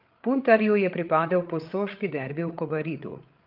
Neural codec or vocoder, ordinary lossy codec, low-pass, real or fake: codec, 16 kHz, 8 kbps, FreqCodec, larger model; Opus, 24 kbps; 5.4 kHz; fake